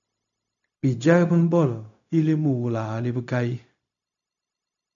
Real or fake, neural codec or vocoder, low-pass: fake; codec, 16 kHz, 0.4 kbps, LongCat-Audio-Codec; 7.2 kHz